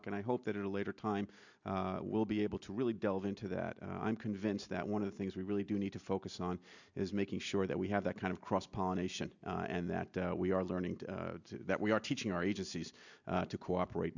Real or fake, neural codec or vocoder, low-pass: real; none; 7.2 kHz